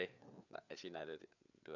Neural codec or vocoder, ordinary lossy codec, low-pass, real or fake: codec, 16 kHz, 4 kbps, FreqCodec, larger model; none; 7.2 kHz; fake